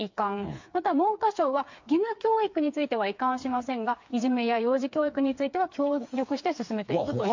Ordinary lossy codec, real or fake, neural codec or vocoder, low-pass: MP3, 48 kbps; fake; codec, 16 kHz, 4 kbps, FreqCodec, smaller model; 7.2 kHz